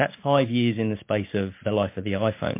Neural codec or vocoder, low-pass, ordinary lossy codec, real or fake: none; 3.6 kHz; MP3, 24 kbps; real